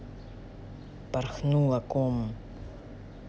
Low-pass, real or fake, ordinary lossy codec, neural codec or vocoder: none; real; none; none